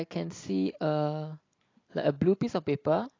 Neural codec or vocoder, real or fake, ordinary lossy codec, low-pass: none; real; AAC, 32 kbps; 7.2 kHz